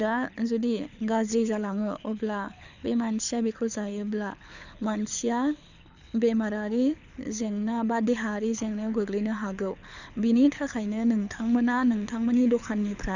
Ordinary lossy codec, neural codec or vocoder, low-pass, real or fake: none; codec, 24 kHz, 6 kbps, HILCodec; 7.2 kHz; fake